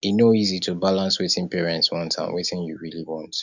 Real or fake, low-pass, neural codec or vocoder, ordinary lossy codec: real; 7.2 kHz; none; none